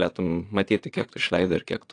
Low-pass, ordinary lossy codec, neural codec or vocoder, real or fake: 9.9 kHz; AAC, 48 kbps; vocoder, 22.05 kHz, 80 mel bands, Vocos; fake